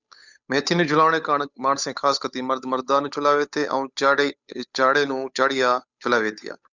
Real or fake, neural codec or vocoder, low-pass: fake; codec, 16 kHz, 8 kbps, FunCodec, trained on Chinese and English, 25 frames a second; 7.2 kHz